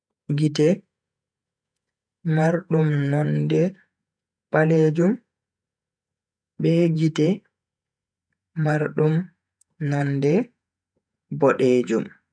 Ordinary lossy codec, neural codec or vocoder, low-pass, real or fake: none; vocoder, 48 kHz, 128 mel bands, Vocos; 9.9 kHz; fake